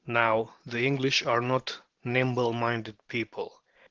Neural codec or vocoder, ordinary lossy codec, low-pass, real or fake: vocoder, 44.1 kHz, 128 mel bands every 512 samples, BigVGAN v2; Opus, 16 kbps; 7.2 kHz; fake